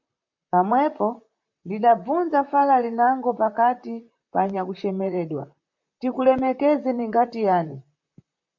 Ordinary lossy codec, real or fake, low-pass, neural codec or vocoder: MP3, 64 kbps; fake; 7.2 kHz; vocoder, 44.1 kHz, 128 mel bands, Pupu-Vocoder